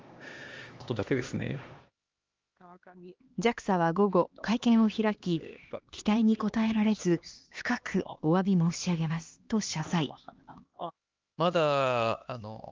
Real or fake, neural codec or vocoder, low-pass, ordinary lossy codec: fake; codec, 16 kHz, 2 kbps, X-Codec, HuBERT features, trained on LibriSpeech; 7.2 kHz; Opus, 32 kbps